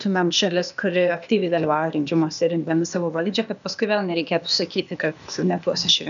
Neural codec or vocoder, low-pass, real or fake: codec, 16 kHz, 0.8 kbps, ZipCodec; 7.2 kHz; fake